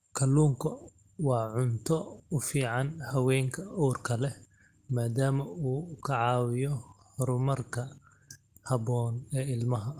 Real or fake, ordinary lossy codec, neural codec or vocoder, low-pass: real; Opus, 24 kbps; none; 14.4 kHz